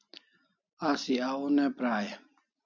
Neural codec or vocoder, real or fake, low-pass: none; real; 7.2 kHz